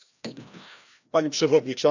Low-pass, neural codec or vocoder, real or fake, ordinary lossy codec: 7.2 kHz; codec, 16 kHz, 1 kbps, FreqCodec, larger model; fake; none